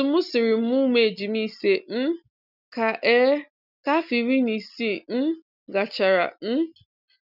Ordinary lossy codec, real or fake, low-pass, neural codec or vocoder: none; real; 5.4 kHz; none